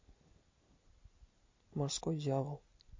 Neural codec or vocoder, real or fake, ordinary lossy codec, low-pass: none; real; MP3, 32 kbps; 7.2 kHz